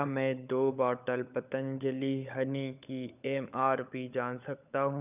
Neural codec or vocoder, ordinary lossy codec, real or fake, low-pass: codec, 16 kHz, 16 kbps, FunCodec, trained on LibriTTS, 50 frames a second; none; fake; 3.6 kHz